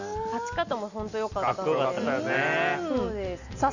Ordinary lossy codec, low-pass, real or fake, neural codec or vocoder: none; 7.2 kHz; real; none